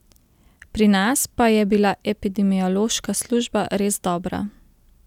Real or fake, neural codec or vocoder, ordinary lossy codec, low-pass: real; none; none; 19.8 kHz